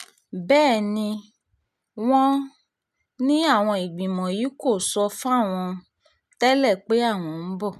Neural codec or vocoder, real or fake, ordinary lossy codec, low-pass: none; real; none; 14.4 kHz